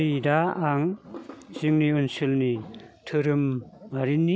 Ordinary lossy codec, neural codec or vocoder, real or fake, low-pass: none; none; real; none